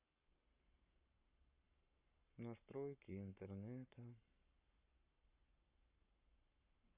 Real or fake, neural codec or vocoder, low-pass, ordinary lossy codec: fake; codec, 44.1 kHz, 7.8 kbps, Pupu-Codec; 3.6 kHz; none